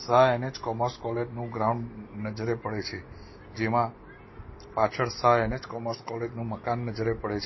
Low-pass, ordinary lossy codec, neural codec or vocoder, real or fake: 7.2 kHz; MP3, 24 kbps; none; real